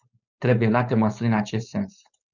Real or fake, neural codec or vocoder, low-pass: fake; codec, 16 kHz, 4.8 kbps, FACodec; 7.2 kHz